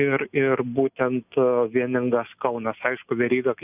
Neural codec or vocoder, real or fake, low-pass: none; real; 3.6 kHz